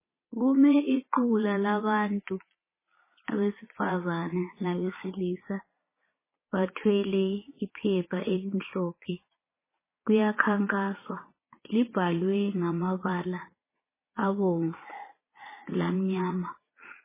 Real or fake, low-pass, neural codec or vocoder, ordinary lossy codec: fake; 3.6 kHz; vocoder, 22.05 kHz, 80 mel bands, Vocos; MP3, 16 kbps